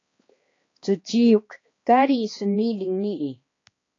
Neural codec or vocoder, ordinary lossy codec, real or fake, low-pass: codec, 16 kHz, 2 kbps, X-Codec, HuBERT features, trained on balanced general audio; AAC, 32 kbps; fake; 7.2 kHz